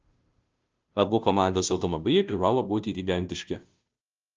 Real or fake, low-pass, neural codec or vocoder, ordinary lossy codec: fake; 7.2 kHz; codec, 16 kHz, 0.5 kbps, FunCodec, trained on Chinese and English, 25 frames a second; Opus, 24 kbps